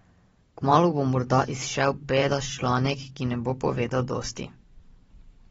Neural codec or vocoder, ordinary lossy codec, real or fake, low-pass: vocoder, 44.1 kHz, 128 mel bands every 512 samples, BigVGAN v2; AAC, 24 kbps; fake; 19.8 kHz